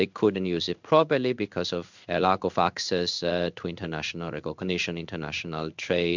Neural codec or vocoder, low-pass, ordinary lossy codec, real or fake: codec, 16 kHz in and 24 kHz out, 1 kbps, XY-Tokenizer; 7.2 kHz; MP3, 64 kbps; fake